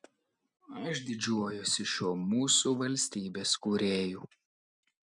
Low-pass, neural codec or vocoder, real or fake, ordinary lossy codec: 10.8 kHz; none; real; MP3, 96 kbps